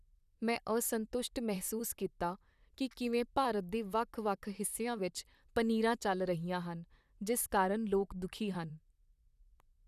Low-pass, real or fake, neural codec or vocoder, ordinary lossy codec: 14.4 kHz; fake; vocoder, 44.1 kHz, 128 mel bands, Pupu-Vocoder; none